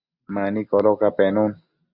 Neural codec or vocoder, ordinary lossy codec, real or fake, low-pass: none; Opus, 64 kbps; real; 5.4 kHz